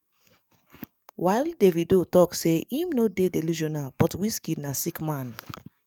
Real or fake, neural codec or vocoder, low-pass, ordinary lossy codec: fake; codec, 44.1 kHz, 7.8 kbps, DAC; 19.8 kHz; none